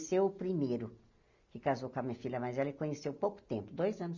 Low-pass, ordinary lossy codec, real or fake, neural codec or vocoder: 7.2 kHz; none; real; none